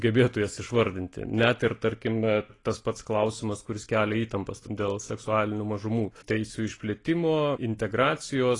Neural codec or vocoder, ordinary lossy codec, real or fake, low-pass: none; AAC, 32 kbps; real; 10.8 kHz